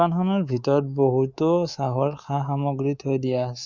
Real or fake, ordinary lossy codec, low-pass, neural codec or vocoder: fake; Opus, 64 kbps; 7.2 kHz; codec, 24 kHz, 3.1 kbps, DualCodec